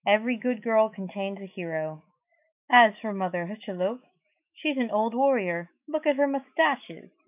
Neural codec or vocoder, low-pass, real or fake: autoencoder, 48 kHz, 128 numbers a frame, DAC-VAE, trained on Japanese speech; 3.6 kHz; fake